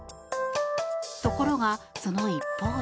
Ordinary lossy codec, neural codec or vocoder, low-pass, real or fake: none; none; none; real